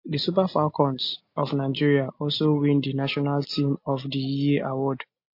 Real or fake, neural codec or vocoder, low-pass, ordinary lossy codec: real; none; 5.4 kHz; MP3, 32 kbps